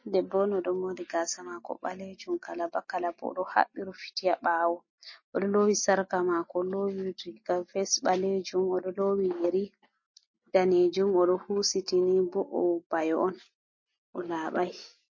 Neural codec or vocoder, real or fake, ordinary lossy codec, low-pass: none; real; MP3, 32 kbps; 7.2 kHz